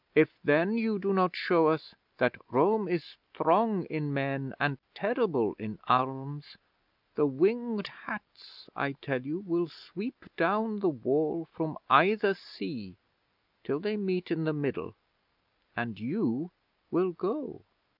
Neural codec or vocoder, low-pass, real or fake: none; 5.4 kHz; real